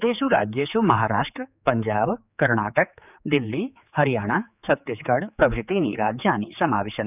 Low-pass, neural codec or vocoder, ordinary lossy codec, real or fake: 3.6 kHz; codec, 16 kHz, 4 kbps, X-Codec, HuBERT features, trained on general audio; none; fake